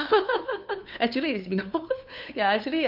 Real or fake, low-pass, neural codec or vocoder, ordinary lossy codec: fake; 5.4 kHz; codec, 16 kHz, 2 kbps, FunCodec, trained on LibriTTS, 25 frames a second; none